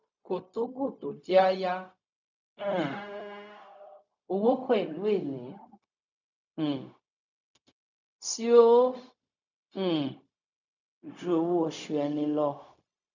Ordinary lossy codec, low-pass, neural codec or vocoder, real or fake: none; 7.2 kHz; codec, 16 kHz, 0.4 kbps, LongCat-Audio-Codec; fake